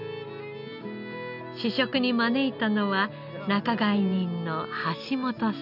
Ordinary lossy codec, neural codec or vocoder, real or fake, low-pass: none; none; real; 5.4 kHz